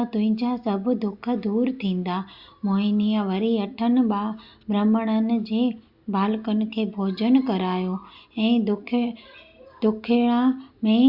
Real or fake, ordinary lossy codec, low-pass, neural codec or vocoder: real; Opus, 64 kbps; 5.4 kHz; none